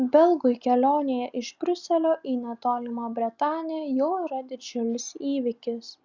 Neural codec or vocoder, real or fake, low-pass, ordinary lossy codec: none; real; 7.2 kHz; Opus, 64 kbps